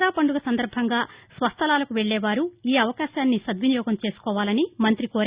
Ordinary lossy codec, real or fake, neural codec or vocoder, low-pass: Opus, 64 kbps; real; none; 3.6 kHz